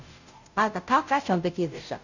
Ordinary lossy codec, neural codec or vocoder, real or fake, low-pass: none; codec, 16 kHz, 0.5 kbps, FunCodec, trained on Chinese and English, 25 frames a second; fake; 7.2 kHz